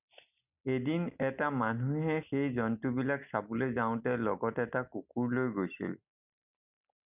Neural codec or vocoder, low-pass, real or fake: none; 3.6 kHz; real